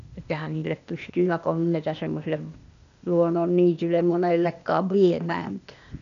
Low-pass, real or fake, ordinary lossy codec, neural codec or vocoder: 7.2 kHz; fake; none; codec, 16 kHz, 0.8 kbps, ZipCodec